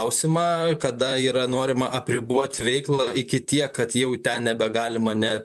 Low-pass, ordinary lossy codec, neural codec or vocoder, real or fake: 14.4 kHz; MP3, 96 kbps; vocoder, 44.1 kHz, 128 mel bands, Pupu-Vocoder; fake